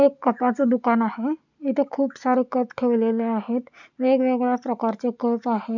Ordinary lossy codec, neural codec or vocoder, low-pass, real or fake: none; none; 7.2 kHz; real